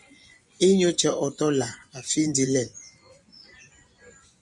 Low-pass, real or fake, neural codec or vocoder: 10.8 kHz; fake; vocoder, 24 kHz, 100 mel bands, Vocos